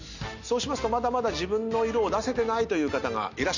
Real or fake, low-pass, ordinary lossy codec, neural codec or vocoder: real; 7.2 kHz; none; none